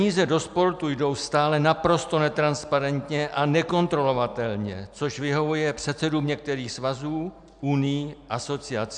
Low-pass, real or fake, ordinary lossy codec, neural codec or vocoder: 9.9 kHz; real; MP3, 96 kbps; none